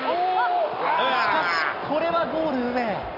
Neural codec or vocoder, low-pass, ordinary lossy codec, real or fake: none; 5.4 kHz; none; real